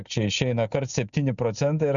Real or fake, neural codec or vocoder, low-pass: real; none; 7.2 kHz